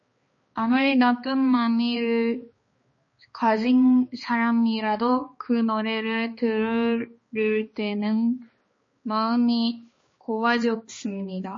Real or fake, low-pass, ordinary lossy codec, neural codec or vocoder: fake; 7.2 kHz; MP3, 32 kbps; codec, 16 kHz, 2 kbps, X-Codec, HuBERT features, trained on balanced general audio